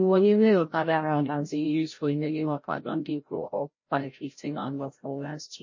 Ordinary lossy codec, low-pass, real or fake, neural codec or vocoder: MP3, 32 kbps; 7.2 kHz; fake; codec, 16 kHz, 0.5 kbps, FreqCodec, larger model